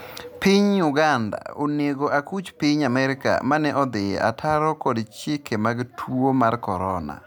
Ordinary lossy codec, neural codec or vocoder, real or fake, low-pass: none; none; real; none